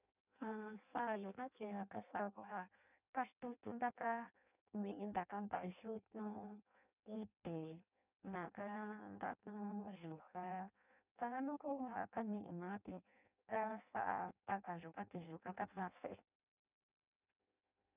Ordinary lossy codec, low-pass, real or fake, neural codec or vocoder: none; 3.6 kHz; fake; codec, 16 kHz in and 24 kHz out, 0.6 kbps, FireRedTTS-2 codec